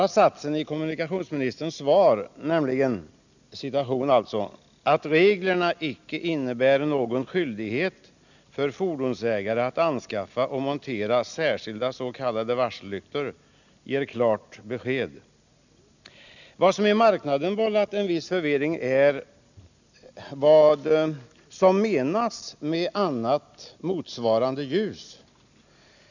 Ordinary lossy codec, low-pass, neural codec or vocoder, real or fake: none; 7.2 kHz; none; real